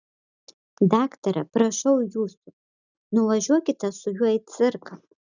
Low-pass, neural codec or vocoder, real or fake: 7.2 kHz; none; real